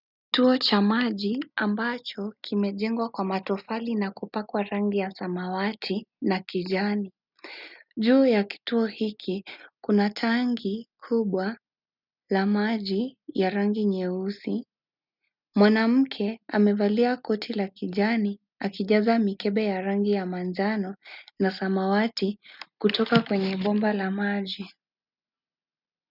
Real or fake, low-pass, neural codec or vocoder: real; 5.4 kHz; none